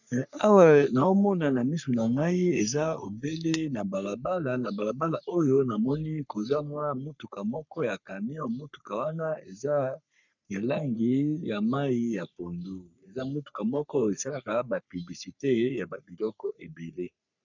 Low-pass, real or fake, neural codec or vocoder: 7.2 kHz; fake; codec, 44.1 kHz, 3.4 kbps, Pupu-Codec